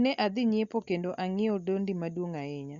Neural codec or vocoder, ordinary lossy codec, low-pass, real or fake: none; Opus, 64 kbps; 7.2 kHz; real